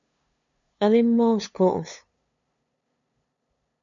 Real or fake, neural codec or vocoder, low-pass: fake; codec, 16 kHz, 2 kbps, FunCodec, trained on LibriTTS, 25 frames a second; 7.2 kHz